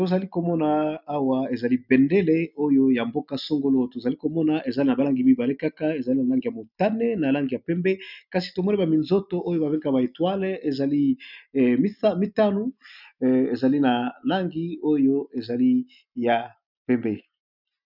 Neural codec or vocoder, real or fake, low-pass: none; real; 5.4 kHz